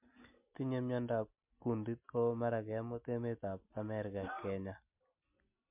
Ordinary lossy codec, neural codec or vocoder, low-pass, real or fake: MP3, 32 kbps; none; 3.6 kHz; real